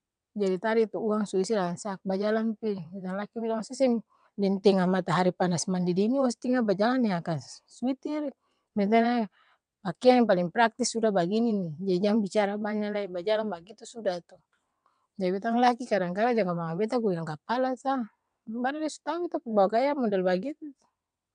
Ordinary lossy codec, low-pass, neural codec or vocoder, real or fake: none; 9.9 kHz; vocoder, 22.05 kHz, 80 mel bands, WaveNeXt; fake